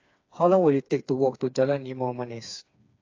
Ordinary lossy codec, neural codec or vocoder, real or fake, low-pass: AAC, 48 kbps; codec, 16 kHz, 4 kbps, FreqCodec, smaller model; fake; 7.2 kHz